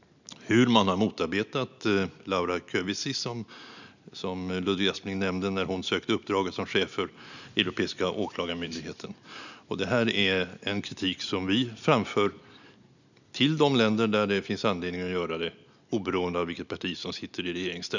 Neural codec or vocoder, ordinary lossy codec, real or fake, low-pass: none; none; real; 7.2 kHz